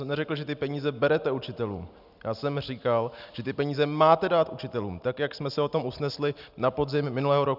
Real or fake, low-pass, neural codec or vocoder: real; 5.4 kHz; none